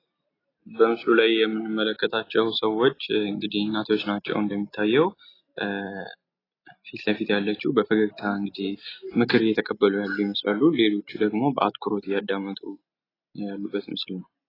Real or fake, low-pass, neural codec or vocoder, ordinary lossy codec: real; 5.4 kHz; none; AAC, 24 kbps